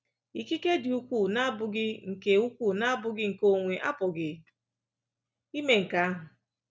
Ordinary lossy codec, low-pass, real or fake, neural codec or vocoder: none; none; real; none